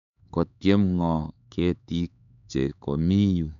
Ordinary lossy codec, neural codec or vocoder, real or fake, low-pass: none; codec, 16 kHz, 4 kbps, X-Codec, HuBERT features, trained on LibriSpeech; fake; 7.2 kHz